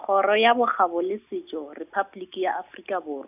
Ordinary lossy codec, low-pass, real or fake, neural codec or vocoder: none; 3.6 kHz; real; none